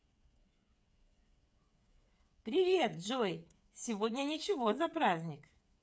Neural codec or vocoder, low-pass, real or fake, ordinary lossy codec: codec, 16 kHz, 8 kbps, FreqCodec, smaller model; none; fake; none